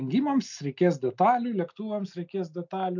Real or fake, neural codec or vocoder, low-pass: real; none; 7.2 kHz